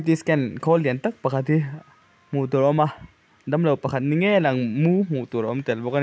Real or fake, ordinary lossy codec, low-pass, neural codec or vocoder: real; none; none; none